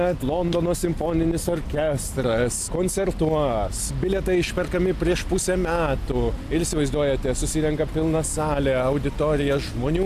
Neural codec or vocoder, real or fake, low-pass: none; real; 14.4 kHz